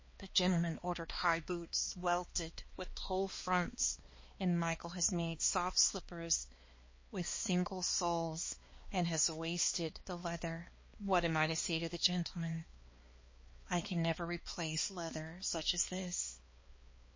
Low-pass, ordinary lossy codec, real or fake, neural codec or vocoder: 7.2 kHz; MP3, 32 kbps; fake; codec, 16 kHz, 2 kbps, X-Codec, HuBERT features, trained on balanced general audio